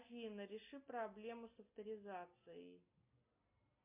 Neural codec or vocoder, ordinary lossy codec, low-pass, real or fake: none; MP3, 24 kbps; 3.6 kHz; real